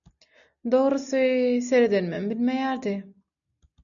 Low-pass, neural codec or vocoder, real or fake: 7.2 kHz; none; real